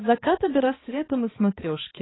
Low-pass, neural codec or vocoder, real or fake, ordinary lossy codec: 7.2 kHz; codec, 16 kHz, 2 kbps, X-Codec, HuBERT features, trained on balanced general audio; fake; AAC, 16 kbps